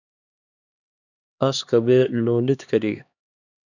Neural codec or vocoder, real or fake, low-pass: codec, 16 kHz, 2 kbps, X-Codec, HuBERT features, trained on LibriSpeech; fake; 7.2 kHz